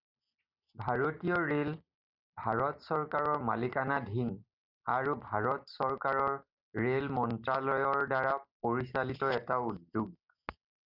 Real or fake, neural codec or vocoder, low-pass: real; none; 5.4 kHz